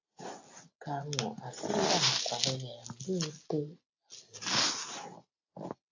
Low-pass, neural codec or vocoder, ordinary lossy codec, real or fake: 7.2 kHz; none; AAC, 48 kbps; real